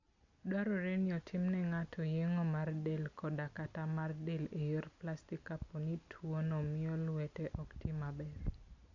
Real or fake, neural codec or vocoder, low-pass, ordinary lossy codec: real; none; 7.2 kHz; AAC, 48 kbps